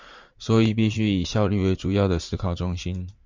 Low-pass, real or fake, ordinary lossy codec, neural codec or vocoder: 7.2 kHz; fake; MP3, 64 kbps; vocoder, 44.1 kHz, 80 mel bands, Vocos